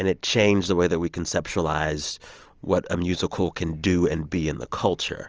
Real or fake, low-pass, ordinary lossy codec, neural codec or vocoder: real; 7.2 kHz; Opus, 24 kbps; none